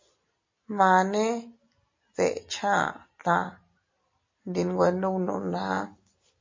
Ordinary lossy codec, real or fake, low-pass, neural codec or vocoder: MP3, 32 kbps; real; 7.2 kHz; none